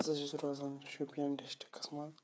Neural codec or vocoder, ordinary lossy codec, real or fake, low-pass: codec, 16 kHz, 8 kbps, FreqCodec, smaller model; none; fake; none